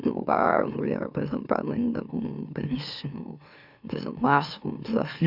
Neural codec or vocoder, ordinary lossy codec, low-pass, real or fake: autoencoder, 44.1 kHz, a latent of 192 numbers a frame, MeloTTS; none; 5.4 kHz; fake